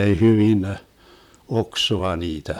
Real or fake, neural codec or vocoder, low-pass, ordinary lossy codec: fake; vocoder, 44.1 kHz, 128 mel bands, Pupu-Vocoder; 19.8 kHz; none